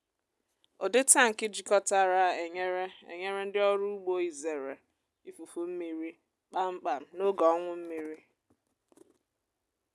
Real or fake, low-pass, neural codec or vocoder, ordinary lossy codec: real; none; none; none